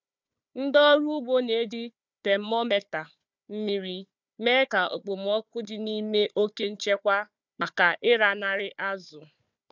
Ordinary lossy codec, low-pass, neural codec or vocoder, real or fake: none; 7.2 kHz; codec, 16 kHz, 4 kbps, FunCodec, trained on Chinese and English, 50 frames a second; fake